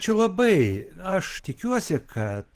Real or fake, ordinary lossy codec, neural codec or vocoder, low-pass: fake; Opus, 16 kbps; vocoder, 44.1 kHz, 128 mel bands every 512 samples, BigVGAN v2; 14.4 kHz